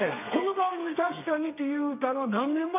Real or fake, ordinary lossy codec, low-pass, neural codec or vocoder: fake; none; 3.6 kHz; codec, 32 kHz, 1.9 kbps, SNAC